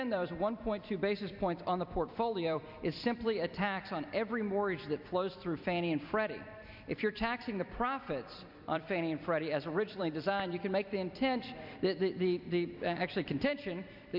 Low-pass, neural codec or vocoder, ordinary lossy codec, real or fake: 5.4 kHz; vocoder, 44.1 kHz, 128 mel bands every 256 samples, BigVGAN v2; MP3, 48 kbps; fake